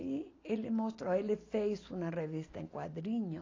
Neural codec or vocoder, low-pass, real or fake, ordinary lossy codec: none; 7.2 kHz; real; AAC, 32 kbps